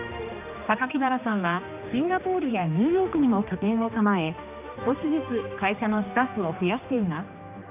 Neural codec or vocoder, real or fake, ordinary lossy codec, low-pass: codec, 16 kHz, 2 kbps, X-Codec, HuBERT features, trained on general audio; fake; none; 3.6 kHz